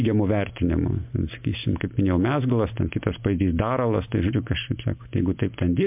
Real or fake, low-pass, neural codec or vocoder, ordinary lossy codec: real; 3.6 kHz; none; MP3, 32 kbps